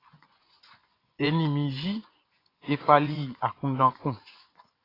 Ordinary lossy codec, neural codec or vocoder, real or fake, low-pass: AAC, 24 kbps; vocoder, 24 kHz, 100 mel bands, Vocos; fake; 5.4 kHz